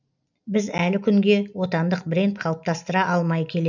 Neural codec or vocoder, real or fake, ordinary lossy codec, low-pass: none; real; none; 7.2 kHz